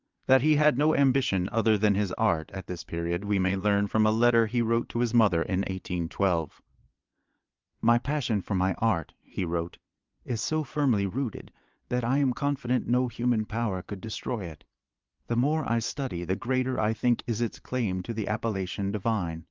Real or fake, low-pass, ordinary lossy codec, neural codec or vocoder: fake; 7.2 kHz; Opus, 32 kbps; vocoder, 22.05 kHz, 80 mel bands, Vocos